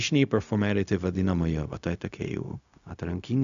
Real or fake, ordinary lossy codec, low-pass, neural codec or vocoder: fake; MP3, 96 kbps; 7.2 kHz; codec, 16 kHz, 0.4 kbps, LongCat-Audio-Codec